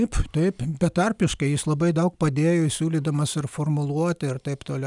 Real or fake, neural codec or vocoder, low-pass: real; none; 10.8 kHz